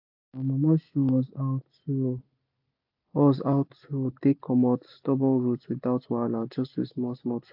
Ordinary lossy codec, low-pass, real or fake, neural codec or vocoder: AAC, 48 kbps; 5.4 kHz; real; none